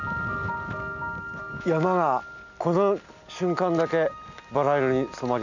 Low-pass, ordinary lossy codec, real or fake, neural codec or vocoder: 7.2 kHz; none; real; none